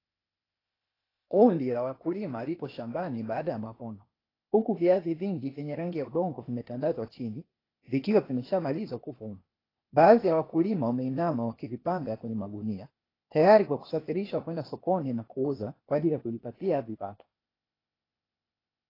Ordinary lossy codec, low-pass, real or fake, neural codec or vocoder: AAC, 24 kbps; 5.4 kHz; fake; codec, 16 kHz, 0.8 kbps, ZipCodec